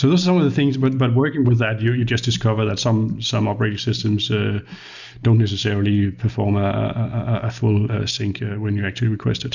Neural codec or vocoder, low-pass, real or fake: none; 7.2 kHz; real